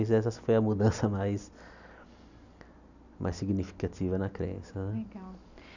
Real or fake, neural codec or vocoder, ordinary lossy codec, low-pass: real; none; none; 7.2 kHz